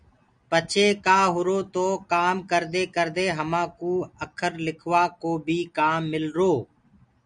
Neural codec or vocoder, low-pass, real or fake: none; 9.9 kHz; real